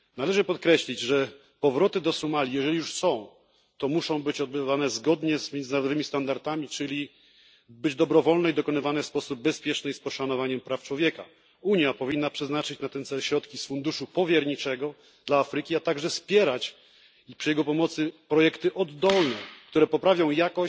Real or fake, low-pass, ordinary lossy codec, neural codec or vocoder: real; none; none; none